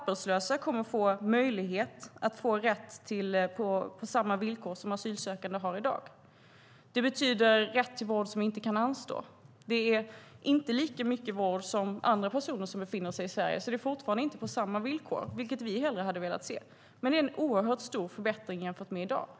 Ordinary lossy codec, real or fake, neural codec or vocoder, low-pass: none; real; none; none